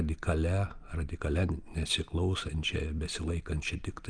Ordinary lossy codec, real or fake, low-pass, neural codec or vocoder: Opus, 32 kbps; real; 9.9 kHz; none